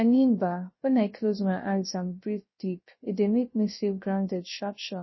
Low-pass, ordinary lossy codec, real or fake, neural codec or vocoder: 7.2 kHz; MP3, 24 kbps; fake; codec, 16 kHz, 0.3 kbps, FocalCodec